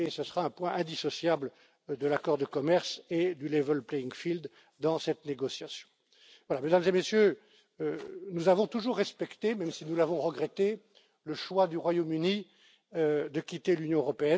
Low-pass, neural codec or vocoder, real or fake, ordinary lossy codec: none; none; real; none